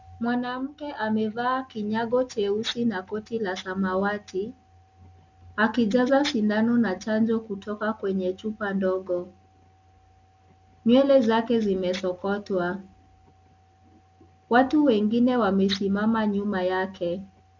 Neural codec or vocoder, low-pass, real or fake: none; 7.2 kHz; real